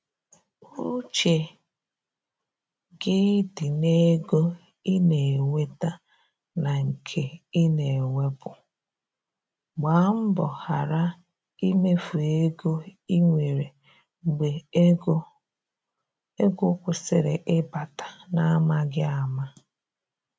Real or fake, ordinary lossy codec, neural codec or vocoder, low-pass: real; none; none; none